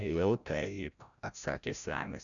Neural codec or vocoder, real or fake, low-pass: codec, 16 kHz, 0.5 kbps, FreqCodec, larger model; fake; 7.2 kHz